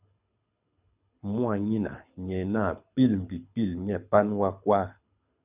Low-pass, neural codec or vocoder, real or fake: 3.6 kHz; codec, 24 kHz, 6 kbps, HILCodec; fake